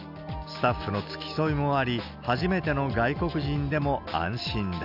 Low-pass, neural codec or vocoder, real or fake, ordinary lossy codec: 5.4 kHz; none; real; none